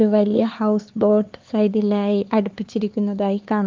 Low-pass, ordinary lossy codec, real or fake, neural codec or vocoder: 7.2 kHz; Opus, 32 kbps; fake; codec, 16 kHz, 4 kbps, X-Codec, HuBERT features, trained on LibriSpeech